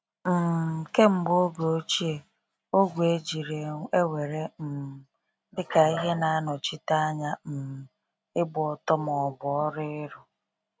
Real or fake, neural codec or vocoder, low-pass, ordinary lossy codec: real; none; none; none